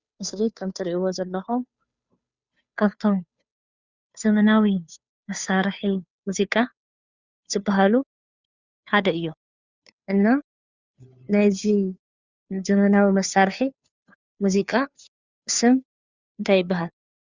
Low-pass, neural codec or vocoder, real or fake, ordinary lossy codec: 7.2 kHz; codec, 16 kHz, 2 kbps, FunCodec, trained on Chinese and English, 25 frames a second; fake; Opus, 64 kbps